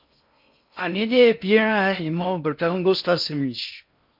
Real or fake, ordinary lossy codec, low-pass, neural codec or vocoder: fake; MP3, 48 kbps; 5.4 kHz; codec, 16 kHz in and 24 kHz out, 0.6 kbps, FocalCodec, streaming, 4096 codes